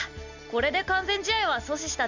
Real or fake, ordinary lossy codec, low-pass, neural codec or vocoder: real; none; 7.2 kHz; none